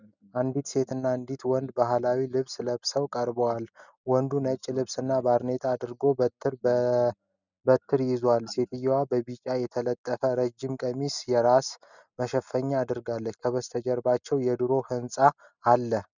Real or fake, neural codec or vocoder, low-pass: real; none; 7.2 kHz